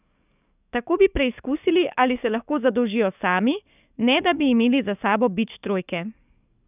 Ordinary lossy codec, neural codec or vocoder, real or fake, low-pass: none; codec, 44.1 kHz, 7.8 kbps, DAC; fake; 3.6 kHz